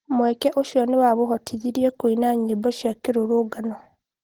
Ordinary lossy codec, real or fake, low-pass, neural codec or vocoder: Opus, 16 kbps; real; 19.8 kHz; none